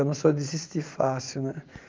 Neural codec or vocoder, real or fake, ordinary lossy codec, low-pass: vocoder, 44.1 kHz, 80 mel bands, Vocos; fake; Opus, 24 kbps; 7.2 kHz